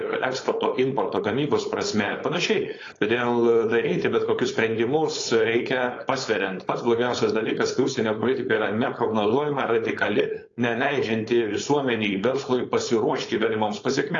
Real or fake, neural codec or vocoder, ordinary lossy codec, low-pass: fake; codec, 16 kHz, 4.8 kbps, FACodec; AAC, 32 kbps; 7.2 kHz